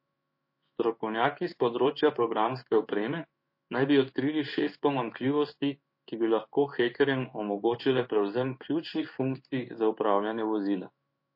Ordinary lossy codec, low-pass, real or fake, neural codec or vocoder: MP3, 32 kbps; 5.4 kHz; fake; codec, 16 kHz in and 24 kHz out, 1 kbps, XY-Tokenizer